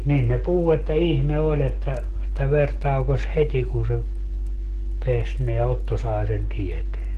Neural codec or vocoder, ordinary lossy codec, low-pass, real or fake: codec, 44.1 kHz, 7.8 kbps, DAC; Opus, 16 kbps; 14.4 kHz; fake